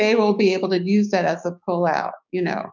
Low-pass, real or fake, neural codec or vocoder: 7.2 kHz; fake; codec, 16 kHz, 6 kbps, DAC